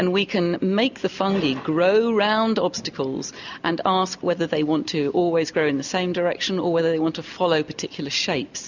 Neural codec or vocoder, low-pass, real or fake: none; 7.2 kHz; real